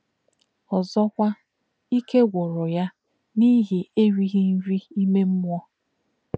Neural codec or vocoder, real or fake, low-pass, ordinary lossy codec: none; real; none; none